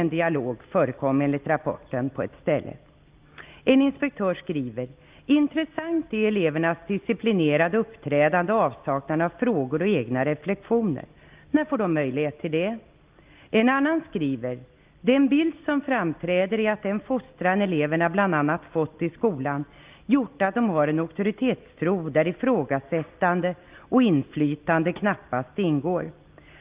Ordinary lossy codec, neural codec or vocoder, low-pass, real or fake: Opus, 24 kbps; none; 3.6 kHz; real